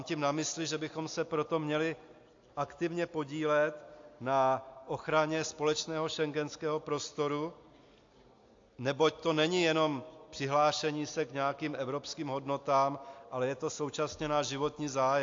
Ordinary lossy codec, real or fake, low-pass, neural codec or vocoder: AAC, 48 kbps; real; 7.2 kHz; none